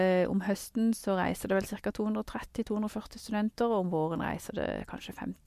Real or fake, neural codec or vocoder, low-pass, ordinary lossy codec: real; none; 14.4 kHz; MP3, 64 kbps